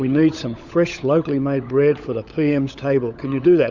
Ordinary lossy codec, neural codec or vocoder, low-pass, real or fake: Opus, 64 kbps; codec, 16 kHz, 16 kbps, FunCodec, trained on LibriTTS, 50 frames a second; 7.2 kHz; fake